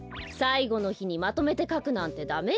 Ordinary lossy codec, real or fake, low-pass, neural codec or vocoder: none; real; none; none